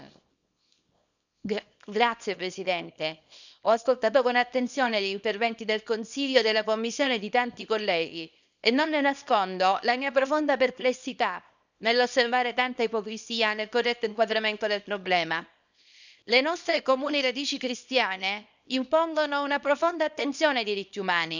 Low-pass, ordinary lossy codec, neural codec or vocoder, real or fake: 7.2 kHz; none; codec, 24 kHz, 0.9 kbps, WavTokenizer, small release; fake